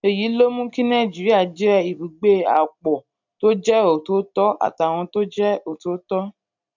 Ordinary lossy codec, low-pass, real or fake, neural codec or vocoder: none; 7.2 kHz; real; none